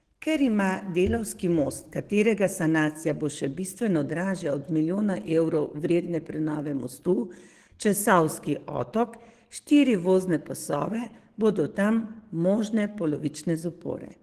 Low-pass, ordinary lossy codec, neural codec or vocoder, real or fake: 14.4 kHz; Opus, 16 kbps; codec, 44.1 kHz, 7.8 kbps, DAC; fake